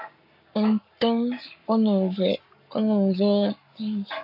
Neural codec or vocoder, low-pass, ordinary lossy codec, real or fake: codec, 44.1 kHz, 7.8 kbps, Pupu-Codec; 5.4 kHz; MP3, 48 kbps; fake